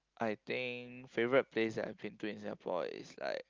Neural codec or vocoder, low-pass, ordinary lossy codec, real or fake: none; 7.2 kHz; Opus, 32 kbps; real